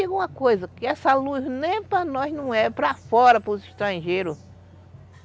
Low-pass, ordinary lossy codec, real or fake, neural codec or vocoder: none; none; real; none